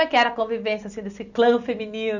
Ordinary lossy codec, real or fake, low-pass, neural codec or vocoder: none; real; 7.2 kHz; none